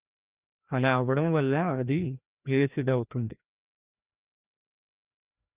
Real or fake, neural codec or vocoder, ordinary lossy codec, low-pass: fake; codec, 16 kHz, 1 kbps, FreqCodec, larger model; Opus, 64 kbps; 3.6 kHz